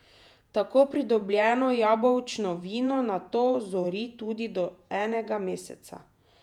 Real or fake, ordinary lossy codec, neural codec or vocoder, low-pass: fake; none; vocoder, 48 kHz, 128 mel bands, Vocos; 19.8 kHz